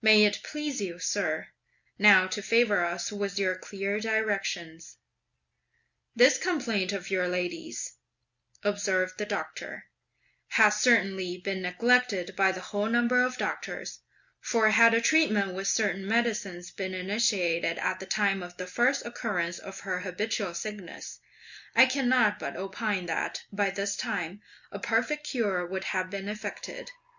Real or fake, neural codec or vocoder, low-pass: real; none; 7.2 kHz